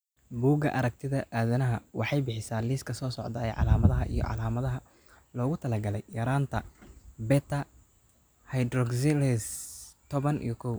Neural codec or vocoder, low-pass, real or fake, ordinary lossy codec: none; none; real; none